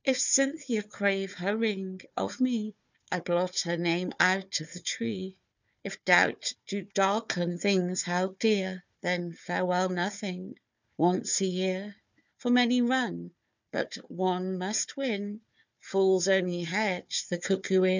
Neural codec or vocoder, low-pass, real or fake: codec, 16 kHz, 4 kbps, FunCodec, trained on Chinese and English, 50 frames a second; 7.2 kHz; fake